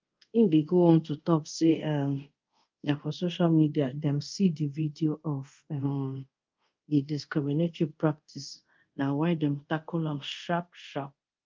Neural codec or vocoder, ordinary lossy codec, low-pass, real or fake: codec, 24 kHz, 0.5 kbps, DualCodec; Opus, 32 kbps; 7.2 kHz; fake